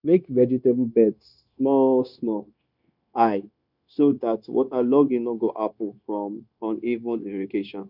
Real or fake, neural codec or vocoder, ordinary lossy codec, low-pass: fake; codec, 16 kHz, 0.9 kbps, LongCat-Audio-Codec; none; 5.4 kHz